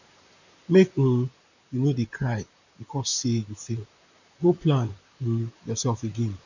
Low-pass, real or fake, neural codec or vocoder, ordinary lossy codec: 7.2 kHz; fake; vocoder, 44.1 kHz, 128 mel bands, Pupu-Vocoder; none